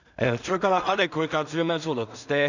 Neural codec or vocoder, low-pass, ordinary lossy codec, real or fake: codec, 16 kHz in and 24 kHz out, 0.4 kbps, LongCat-Audio-Codec, two codebook decoder; 7.2 kHz; none; fake